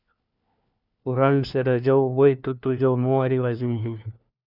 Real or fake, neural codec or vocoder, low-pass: fake; codec, 16 kHz, 1 kbps, FunCodec, trained on LibriTTS, 50 frames a second; 5.4 kHz